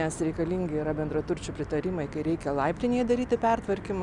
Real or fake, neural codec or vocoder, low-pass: real; none; 10.8 kHz